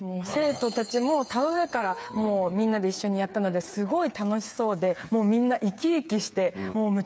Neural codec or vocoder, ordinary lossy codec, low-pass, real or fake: codec, 16 kHz, 8 kbps, FreqCodec, smaller model; none; none; fake